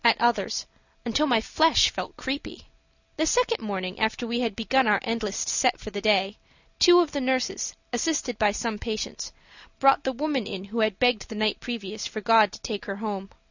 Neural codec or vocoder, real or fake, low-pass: none; real; 7.2 kHz